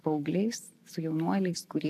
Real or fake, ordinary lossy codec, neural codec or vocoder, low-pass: real; AAC, 64 kbps; none; 14.4 kHz